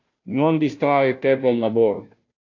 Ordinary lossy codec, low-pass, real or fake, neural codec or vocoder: AAC, 48 kbps; 7.2 kHz; fake; codec, 16 kHz, 0.5 kbps, FunCodec, trained on Chinese and English, 25 frames a second